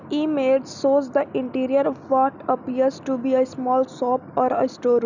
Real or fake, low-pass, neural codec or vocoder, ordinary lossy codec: real; 7.2 kHz; none; none